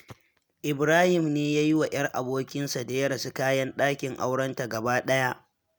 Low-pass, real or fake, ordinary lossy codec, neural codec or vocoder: none; real; none; none